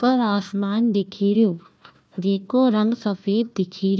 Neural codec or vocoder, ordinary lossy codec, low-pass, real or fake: codec, 16 kHz, 1 kbps, FunCodec, trained on Chinese and English, 50 frames a second; none; none; fake